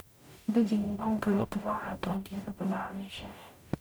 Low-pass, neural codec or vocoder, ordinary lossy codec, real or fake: none; codec, 44.1 kHz, 0.9 kbps, DAC; none; fake